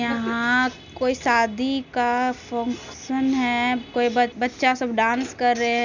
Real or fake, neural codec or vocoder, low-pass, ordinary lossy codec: real; none; 7.2 kHz; none